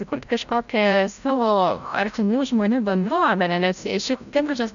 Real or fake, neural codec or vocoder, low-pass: fake; codec, 16 kHz, 0.5 kbps, FreqCodec, larger model; 7.2 kHz